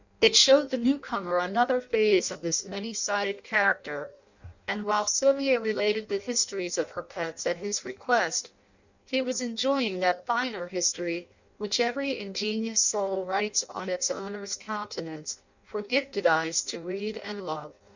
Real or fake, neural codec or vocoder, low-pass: fake; codec, 16 kHz in and 24 kHz out, 0.6 kbps, FireRedTTS-2 codec; 7.2 kHz